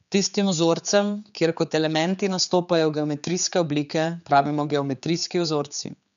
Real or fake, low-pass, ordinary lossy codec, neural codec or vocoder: fake; 7.2 kHz; none; codec, 16 kHz, 4 kbps, X-Codec, HuBERT features, trained on general audio